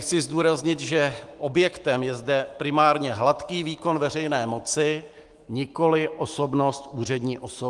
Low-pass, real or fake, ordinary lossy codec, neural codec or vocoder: 10.8 kHz; fake; Opus, 24 kbps; autoencoder, 48 kHz, 128 numbers a frame, DAC-VAE, trained on Japanese speech